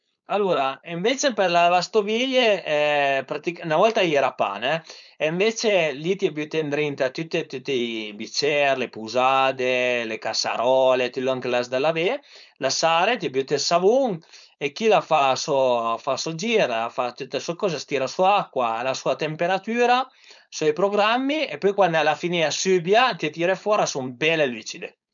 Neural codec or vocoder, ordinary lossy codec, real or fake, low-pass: codec, 16 kHz, 4.8 kbps, FACodec; none; fake; 7.2 kHz